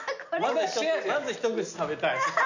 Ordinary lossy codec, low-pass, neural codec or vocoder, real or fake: none; 7.2 kHz; none; real